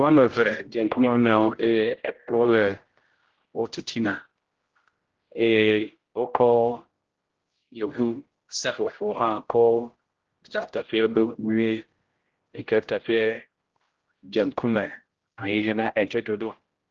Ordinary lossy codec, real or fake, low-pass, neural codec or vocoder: Opus, 16 kbps; fake; 7.2 kHz; codec, 16 kHz, 0.5 kbps, X-Codec, HuBERT features, trained on general audio